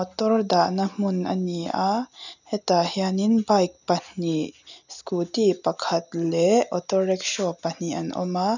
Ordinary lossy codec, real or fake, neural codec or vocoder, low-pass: none; real; none; 7.2 kHz